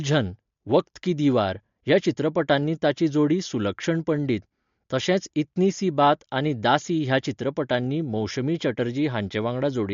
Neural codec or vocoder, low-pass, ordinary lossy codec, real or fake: none; 7.2 kHz; MP3, 48 kbps; real